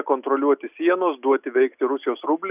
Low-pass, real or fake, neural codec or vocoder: 3.6 kHz; real; none